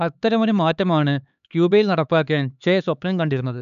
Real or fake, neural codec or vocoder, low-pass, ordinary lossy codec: fake; codec, 16 kHz, 4 kbps, X-Codec, HuBERT features, trained on LibriSpeech; 7.2 kHz; none